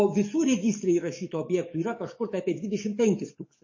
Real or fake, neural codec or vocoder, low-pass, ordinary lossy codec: fake; codec, 44.1 kHz, 7.8 kbps, DAC; 7.2 kHz; MP3, 32 kbps